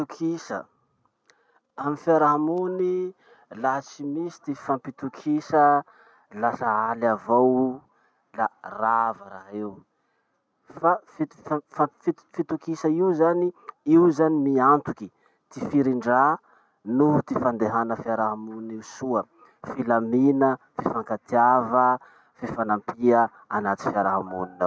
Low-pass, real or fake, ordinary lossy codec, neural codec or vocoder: none; real; none; none